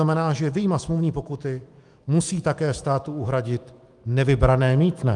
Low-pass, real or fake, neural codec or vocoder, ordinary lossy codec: 10.8 kHz; fake; autoencoder, 48 kHz, 128 numbers a frame, DAC-VAE, trained on Japanese speech; Opus, 32 kbps